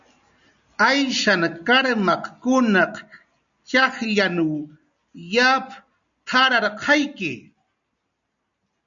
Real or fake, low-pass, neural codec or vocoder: real; 7.2 kHz; none